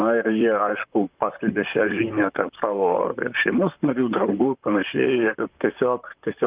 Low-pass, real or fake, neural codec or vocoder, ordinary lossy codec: 3.6 kHz; fake; vocoder, 44.1 kHz, 128 mel bands, Pupu-Vocoder; Opus, 32 kbps